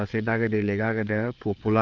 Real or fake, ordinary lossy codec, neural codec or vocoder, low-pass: fake; Opus, 32 kbps; codec, 16 kHz, 4 kbps, FreqCodec, larger model; 7.2 kHz